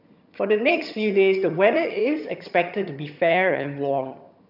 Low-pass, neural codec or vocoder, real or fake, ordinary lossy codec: 5.4 kHz; vocoder, 22.05 kHz, 80 mel bands, HiFi-GAN; fake; none